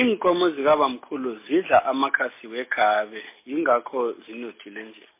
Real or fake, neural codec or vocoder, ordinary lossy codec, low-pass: real; none; MP3, 24 kbps; 3.6 kHz